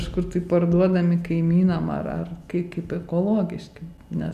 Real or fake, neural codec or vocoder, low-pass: real; none; 14.4 kHz